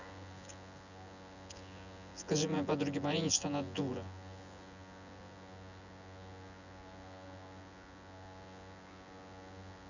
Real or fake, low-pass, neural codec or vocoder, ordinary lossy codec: fake; 7.2 kHz; vocoder, 24 kHz, 100 mel bands, Vocos; none